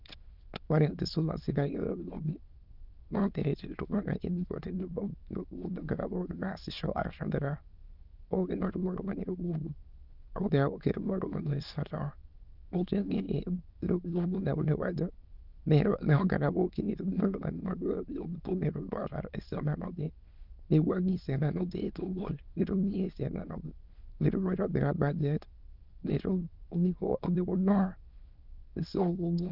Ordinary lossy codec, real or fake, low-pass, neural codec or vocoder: Opus, 32 kbps; fake; 5.4 kHz; autoencoder, 22.05 kHz, a latent of 192 numbers a frame, VITS, trained on many speakers